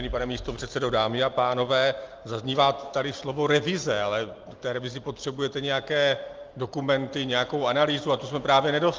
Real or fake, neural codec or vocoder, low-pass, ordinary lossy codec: real; none; 7.2 kHz; Opus, 24 kbps